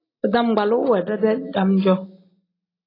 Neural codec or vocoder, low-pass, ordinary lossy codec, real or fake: none; 5.4 kHz; AAC, 24 kbps; real